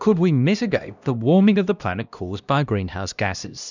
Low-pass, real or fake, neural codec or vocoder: 7.2 kHz; fake; codec, 16 kHz, 1 kbps, X-Codec, HuBERT features, trained on LibriSpeech